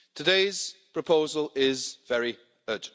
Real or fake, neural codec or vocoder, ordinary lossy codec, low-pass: real; none; none; none